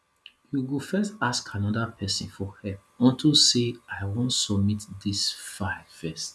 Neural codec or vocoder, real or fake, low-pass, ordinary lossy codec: none; real; none; none